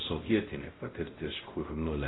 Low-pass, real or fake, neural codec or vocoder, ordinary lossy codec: 7.2 kHz; fake; codec, 16 kHz, 0.5 kbps, X-Codec, WavLM features, trained on Multilingual LibriSpeech; AAC, 16 kbps